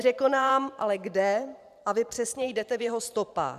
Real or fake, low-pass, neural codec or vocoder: fake; 14.4 kHz; vocoder, 44.1 kHz, 128 mel bands every 512 samples, BigVGAN v2